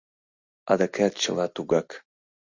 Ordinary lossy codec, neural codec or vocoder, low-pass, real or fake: MP3, 64 kbps; none; 7.2 kHz; real